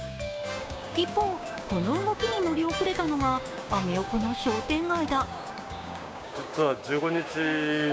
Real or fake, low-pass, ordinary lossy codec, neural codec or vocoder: fake; none; none; codec, 16 kHz, 6 kbps, DAC